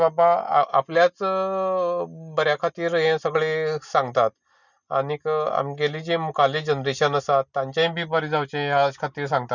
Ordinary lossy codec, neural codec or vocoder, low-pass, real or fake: none; none; 7.2 kHz; real